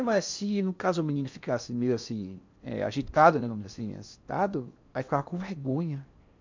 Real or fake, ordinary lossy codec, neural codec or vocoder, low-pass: fake; MP3, 64 kbps; codec, 16 kHz in and 24 kHz out, 0.8 kbps, FocalCodec, streaming, 65536 codes; 7.2 kHz